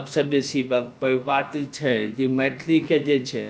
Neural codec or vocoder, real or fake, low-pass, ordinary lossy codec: codec, 16 kHz, about 1 kbps, DyCAST, with the encoder's durations; fake; none; none